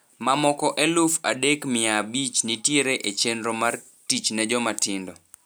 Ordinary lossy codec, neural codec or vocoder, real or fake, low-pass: none; none; real; none